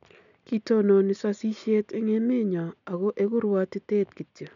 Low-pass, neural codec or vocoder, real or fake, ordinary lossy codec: 7.2 kHz; none; real; none